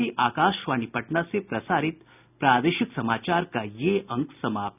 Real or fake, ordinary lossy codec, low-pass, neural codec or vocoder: real; none; 3.6 kHz; none